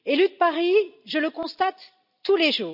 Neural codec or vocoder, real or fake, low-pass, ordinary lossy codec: none; real; 5.4 kHz; none